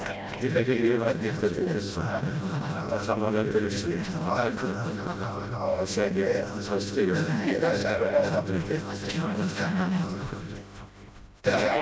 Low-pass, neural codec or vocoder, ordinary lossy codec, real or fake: none; codec, 16 kHz, 0.5 kbps, FreqCodec, smaller model; none; fake